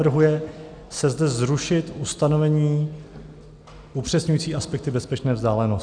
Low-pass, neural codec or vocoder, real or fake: 9.9 kHz; none; real